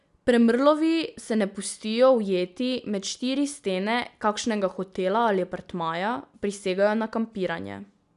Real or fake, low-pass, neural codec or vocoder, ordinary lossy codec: real; 10.8 kHz; none; none